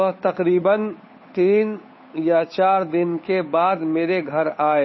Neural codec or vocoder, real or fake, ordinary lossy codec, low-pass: codec, 16 kHz, 8 kbps, FunCodec, trained on Chinese and English, 25 frames a second; fake; MP3, 24 kbps; 7.2 kHz